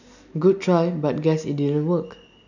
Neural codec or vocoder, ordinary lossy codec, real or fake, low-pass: none; none; real; 7.2 kHz